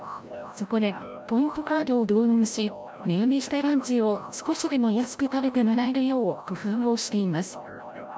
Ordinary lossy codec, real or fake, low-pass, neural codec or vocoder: none; fake; none; codec, 16 kHz, 0.5 kbps, FreqCodec, larger model